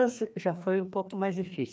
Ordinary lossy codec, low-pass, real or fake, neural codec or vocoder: none; none; fake; codec, 16 kHz, 2 kbps, FreqCodec, larger model